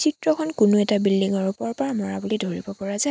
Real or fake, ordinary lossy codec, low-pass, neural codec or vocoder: real; none; none; none